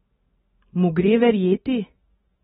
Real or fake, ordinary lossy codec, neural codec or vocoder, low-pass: real; AAC, 16 kbps; none; 10.8 kHz